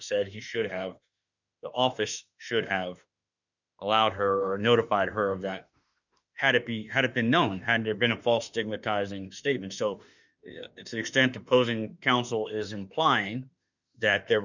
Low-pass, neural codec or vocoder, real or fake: 7.2 kHz; autoencoder, 48 kHz, 32 numbers a frame, DAC-VAE, trained on Japanese speech; fake